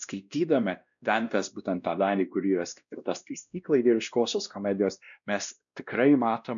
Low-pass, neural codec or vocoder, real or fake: 7.2 kHz; codec, 16 kHz, 1 kbps, X-Codec, WavLM features, trained on Multilingual LibriSpeech; fake